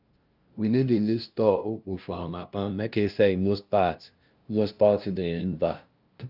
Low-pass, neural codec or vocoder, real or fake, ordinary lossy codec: 5.4 kHz; codec, 16 kHz, 0.5 kbps, FunCodec, trained on LibriTTS, 25 frames a second; fake; Opus, 24 kbps